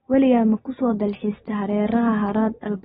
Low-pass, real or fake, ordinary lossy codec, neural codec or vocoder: 14.4 kHz; real; AAC, 16 kbps; none